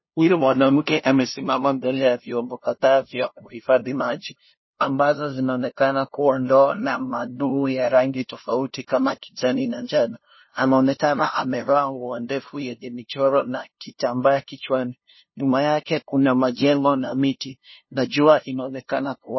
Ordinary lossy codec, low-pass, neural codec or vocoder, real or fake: MP3, 24 kbps; 7.2 kHz; codec, 16 kHz, 0.5 kbps, FunCodec, trained on LibriTTS, 25 frames a second; fake